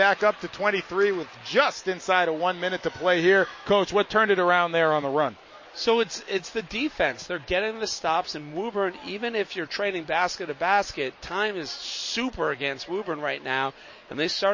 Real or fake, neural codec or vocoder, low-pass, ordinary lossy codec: real; none; 7.2 kHz; MP3, 32 kbps